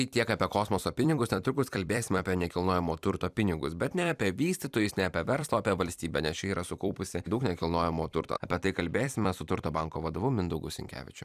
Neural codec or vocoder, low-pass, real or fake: vocoder, 44.1 kHz, 128 mel bands every 256 samples, BigVGAN v2; 14.4 kHz; fake